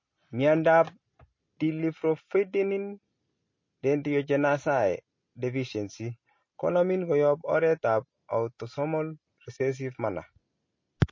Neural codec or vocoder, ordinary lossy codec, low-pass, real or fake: none; MP3, 32 kbps; 7.2 kHz; real